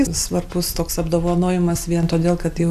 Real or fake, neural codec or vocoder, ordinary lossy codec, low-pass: real; none; AAC, 96 kbps; 14.4 kHz